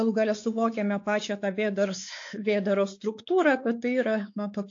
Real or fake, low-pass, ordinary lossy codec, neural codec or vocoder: fake; 7.2 kHz; AAC, 48 kbps; codec, 16 kHz, 4 kbps, X-Codec, WavLM features, trained on Multilingual LibriSpeech